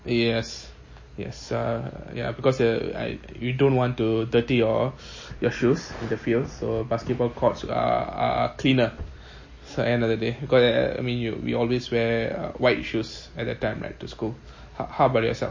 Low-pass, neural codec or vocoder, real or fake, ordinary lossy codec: 7.2 kHz; none; real; MP3, 32 kbps